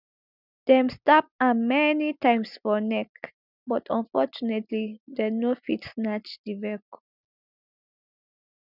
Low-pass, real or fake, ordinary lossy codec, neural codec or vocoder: 5.4 kHz; real; none; none